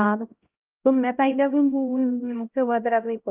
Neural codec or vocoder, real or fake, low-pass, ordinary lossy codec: codec, 16 kHz, 0.5 kbps, X-Codec, HuBERT features, trained on LibriSpeech; fake; 3.6 kHz; Opus, 32 kbps